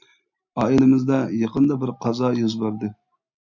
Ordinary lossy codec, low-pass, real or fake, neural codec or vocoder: MP3, 64 kbps; 7.2 kHz; real; none